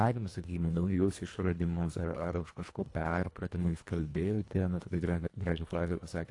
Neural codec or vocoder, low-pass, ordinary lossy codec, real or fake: codec, 24 kHz, 1.5 kbps, HILCodec; 10.8 kHz; AAC, 48 kbps; fake